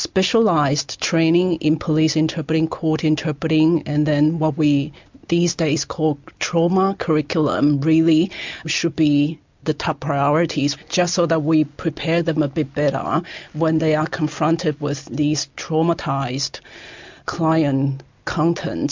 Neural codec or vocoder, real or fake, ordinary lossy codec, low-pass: none; real; MP3, 64 kbps; 7.2 kHz